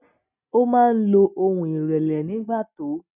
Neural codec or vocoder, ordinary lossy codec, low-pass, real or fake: none; none; 3.6 kHz; real